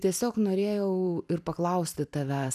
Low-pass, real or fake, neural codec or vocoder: 14.4 kHz; fake; vocoder, 44.1 kHz, 128 mel bands every 256 samples, BigVGAN v2